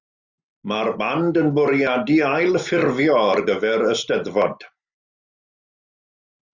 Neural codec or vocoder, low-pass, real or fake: none; 7.2 kHz; real